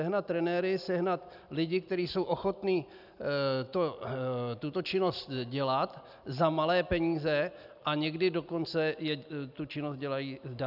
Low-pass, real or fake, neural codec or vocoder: 5.4 kHz; real; none